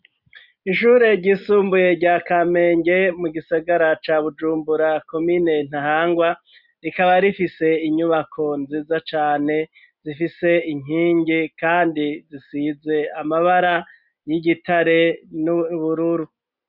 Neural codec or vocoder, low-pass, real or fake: none; 5.4 kHz; real